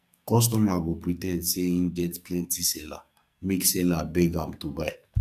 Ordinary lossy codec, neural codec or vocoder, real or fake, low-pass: none; codec, 44.1 kHz, 2.6 kbps, SNAC; fake; 14.4 kHz